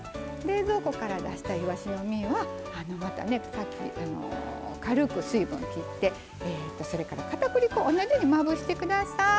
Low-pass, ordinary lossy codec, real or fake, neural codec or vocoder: none; none; real; none